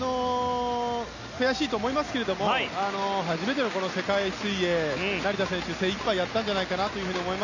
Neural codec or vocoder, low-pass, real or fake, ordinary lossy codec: none; 7.2 kHz; real; none